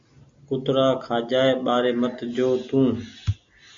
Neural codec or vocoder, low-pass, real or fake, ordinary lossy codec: none; 7.2 kHz; real; MP3, 48 kbps